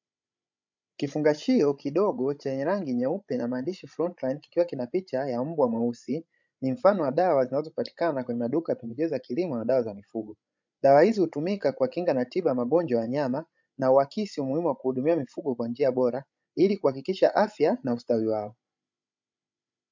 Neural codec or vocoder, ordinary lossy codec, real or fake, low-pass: codec, 16 kHz, 16 kbps, FreqCodec, larger model; MP3, 64 kbps; fake; 7.2 kHz